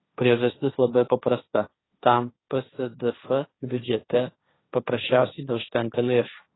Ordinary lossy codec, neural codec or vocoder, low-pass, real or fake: AAC, 16 kbps; codec, 16 kHz, 1.1 kbps, Voila-Tokenizer; 7.2 kHz; fake